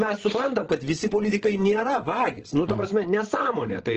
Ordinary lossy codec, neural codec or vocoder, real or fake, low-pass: Opus, 16 kbps; codec, 16 kHz, 8 kbps, FreqCodec, larger model; fake; 7.2 kHz